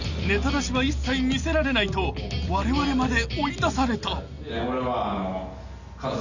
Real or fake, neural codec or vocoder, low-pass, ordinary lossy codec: real; none; 7.2 kHz; none